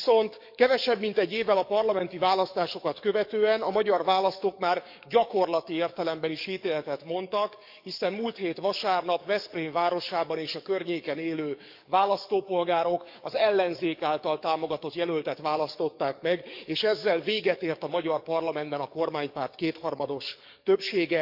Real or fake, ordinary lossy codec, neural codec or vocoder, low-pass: fake; none; codec, 44.1 kHz, 7.8 kbps, DAC; 5.4 kHz